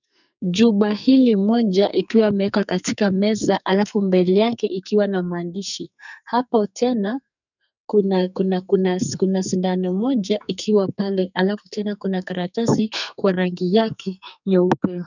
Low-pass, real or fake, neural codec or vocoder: 7.2 kHz; fake; codec, 44.1 kHz, 2.6 kbps, SNAC